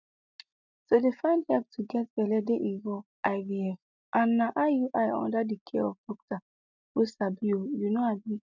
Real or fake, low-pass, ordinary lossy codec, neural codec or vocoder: real; 7.2 kHz; none; none